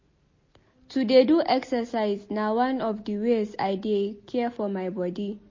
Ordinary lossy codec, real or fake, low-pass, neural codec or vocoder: MP3, 32 kbps; real; 7.2 kHz; none